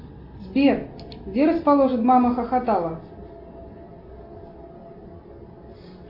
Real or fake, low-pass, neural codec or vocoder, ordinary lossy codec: real; 5.4 kHz; none; AAC, 48 kbps